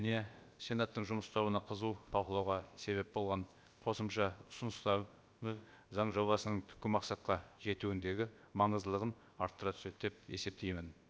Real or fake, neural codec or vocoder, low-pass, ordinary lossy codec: fake; codec, 16 kHz, about 1 kbps, DyCAST, with the encoder's durations; none; none